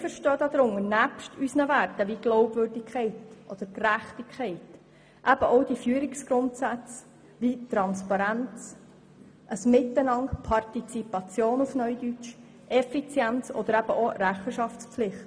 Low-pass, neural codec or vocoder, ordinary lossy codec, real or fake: none; none; none; real